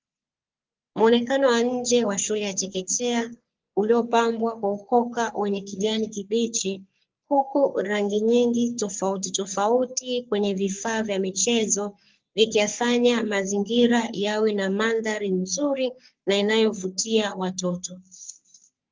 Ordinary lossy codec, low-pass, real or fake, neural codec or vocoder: Opus, 24 kbps; 7.2 kHz; fake; codec, 44.1 kHz, 3.4 kbps, Pupu-Codec